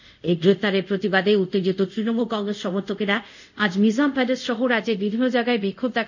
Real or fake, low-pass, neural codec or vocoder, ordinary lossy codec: fake; 7.2 kHz; codec, 24 kHz, 0.5 kbps, DualCodec; none